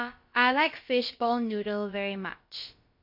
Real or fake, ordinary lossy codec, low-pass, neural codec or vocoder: fake; MP3, 32 kbps; 5.4 kHz; codec, 16 kHz, about 1 kbps, DyCAST, with the encoder's durations